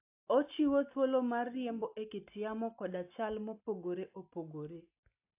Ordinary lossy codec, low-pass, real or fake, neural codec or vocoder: AAC, 32 kbps; 3.6 kHz; real; none